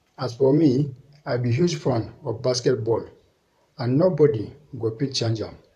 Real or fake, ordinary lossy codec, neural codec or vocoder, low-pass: fake; none; vocoder, 44.1 kHz, 128 mel bands, Pupu-Vocoder; 14.4 kHz